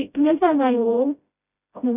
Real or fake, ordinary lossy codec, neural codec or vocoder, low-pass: fake; none; codec, 16 kHz, 0.5 kbps, FreqCodec, smaller model; 3.6 kHz